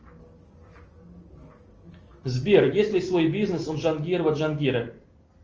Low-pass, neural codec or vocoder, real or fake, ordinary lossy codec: 7.2 kHz; none; real; Opus, 24 kbps